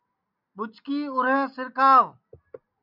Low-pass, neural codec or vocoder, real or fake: 5.4 kHz; none; real